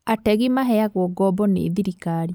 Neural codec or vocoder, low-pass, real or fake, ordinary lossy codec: none; none; real; none